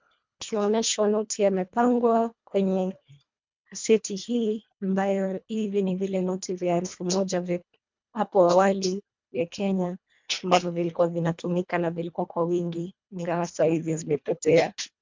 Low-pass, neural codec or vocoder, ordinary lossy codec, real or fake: 7.2 kHz; codec, 24 kHz, 1.5 kbps, HILCodec; MP3, 64 kbps; fake